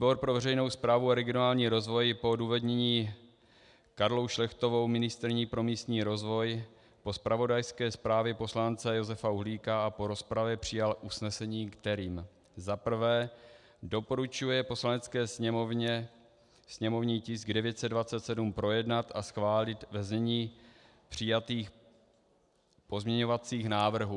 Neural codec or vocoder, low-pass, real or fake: none; 10.8 kHz; real